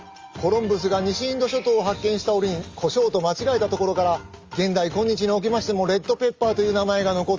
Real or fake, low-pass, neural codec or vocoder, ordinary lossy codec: real; 7.2 kHz; none; Opus, 32 kbps